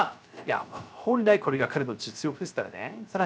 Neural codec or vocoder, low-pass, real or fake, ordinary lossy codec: codec, 16 kHz, 0.3 kbps, FocalCodec; none; fake; none